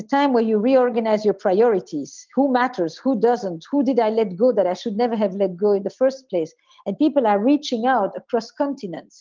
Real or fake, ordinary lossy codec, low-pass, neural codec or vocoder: real; Opus, 24 kbps; 7.2 kHz; none